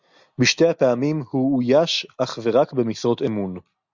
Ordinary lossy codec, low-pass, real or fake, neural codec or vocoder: Opus, 64 kbps; 7.2 kHz; real; none